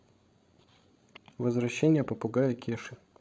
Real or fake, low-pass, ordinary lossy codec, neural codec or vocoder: fake; none; none; codec, 16 kHz, 16 kbps, FreqCodec, larger model